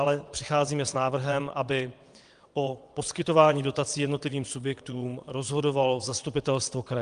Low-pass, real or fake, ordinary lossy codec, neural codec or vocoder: 9.9 kHz; fake; Opus, 32 kbps; vocoder, 22.05 kHz, 80 mel bands, WaveNeXt